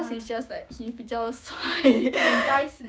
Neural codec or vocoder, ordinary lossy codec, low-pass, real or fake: codec, 16 kHz, 6 kbps, DAC; none; none; fake